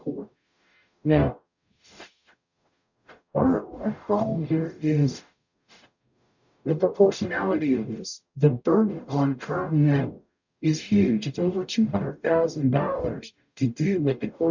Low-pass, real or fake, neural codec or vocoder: 7.2 kHz; fake; codec, 44.1 kHz, 0.9 kbps, DAC